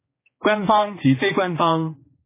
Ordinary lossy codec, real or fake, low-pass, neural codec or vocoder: MP3, 16 kbps; fake; 3.6 kHz; codec, 16 kHz, 2 kbps, X-Codec, HuBERT features, trained on general audio